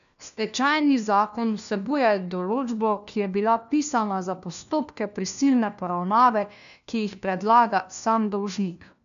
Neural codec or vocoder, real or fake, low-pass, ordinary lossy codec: codec, 16 kHz, 1 kbps, FunCodec, trained on LibriTTS, 50 frames a second; fake; 7.2 kHz; none